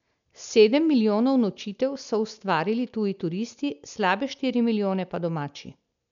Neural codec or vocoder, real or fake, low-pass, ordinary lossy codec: none; real; 7.2 kHz; none